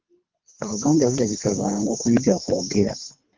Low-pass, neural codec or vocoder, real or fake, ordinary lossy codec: 7.2 kHz; codec, 24 kHz, 3 kbps, HILCodec; fake; Opus, 32 kbps